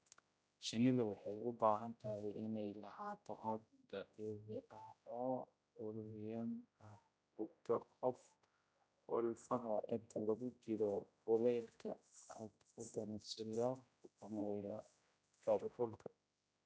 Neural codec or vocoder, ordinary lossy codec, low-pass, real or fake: codec, 16 kHz, 0.5 kbps, X-Codec, HuBERT features, trained on general audio; none; none; fake